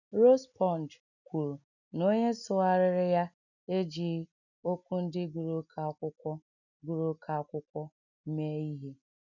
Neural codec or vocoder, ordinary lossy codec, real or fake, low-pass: none; none; real; 7.2 kHz